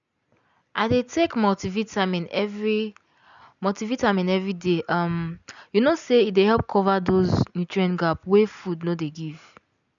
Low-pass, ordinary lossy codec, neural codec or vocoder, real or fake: 7.2 kHz; none; none; real